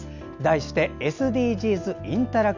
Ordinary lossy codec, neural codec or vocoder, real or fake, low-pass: none; none; real; 7.2 kHz